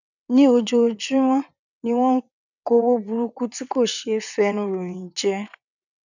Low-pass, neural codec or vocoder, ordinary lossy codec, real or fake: 7.2 kHz; vocoder, 22.05 kHz, 80 mel bands, WaveNeXt; none; fake